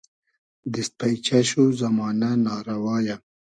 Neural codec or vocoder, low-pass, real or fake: none; 9.9 kHz; real